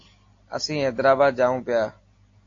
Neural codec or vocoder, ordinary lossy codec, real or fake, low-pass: none; AAC, 48 kbps; real; 7.2 kHz